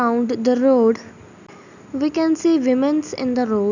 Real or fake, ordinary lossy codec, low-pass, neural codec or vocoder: real; none; 7.2 kHz; none